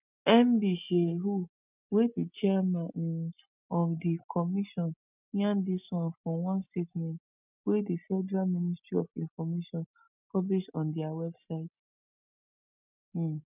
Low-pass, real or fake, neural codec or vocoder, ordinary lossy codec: 3.6 kHz; real; none; none